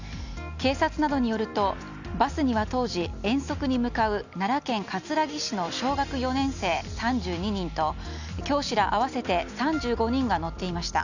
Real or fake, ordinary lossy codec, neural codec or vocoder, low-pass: real; none; none; 7.2 kHz